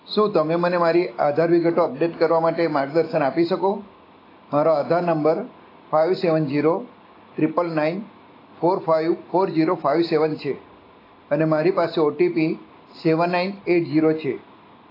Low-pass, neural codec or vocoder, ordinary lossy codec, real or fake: 5.4 kHz; none; AAC, 24 kbps; real